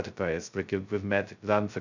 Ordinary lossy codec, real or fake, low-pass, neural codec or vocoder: none; fake; 7.2 kHz; codec, 16 kHz, 0.2 kbps, FocalCodec